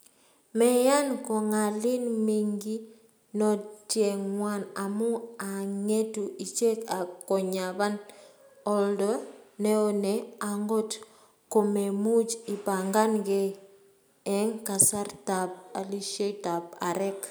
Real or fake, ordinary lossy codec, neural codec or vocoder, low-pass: real; none; none; none